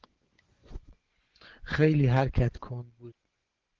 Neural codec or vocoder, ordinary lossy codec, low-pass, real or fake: vocoder, 24 kHz, 100 mel bands, Vocos; Opus, 16 kbps; 7.2 kHz; fake